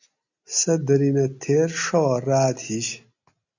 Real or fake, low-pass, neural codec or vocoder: real; 7.2 kHz; none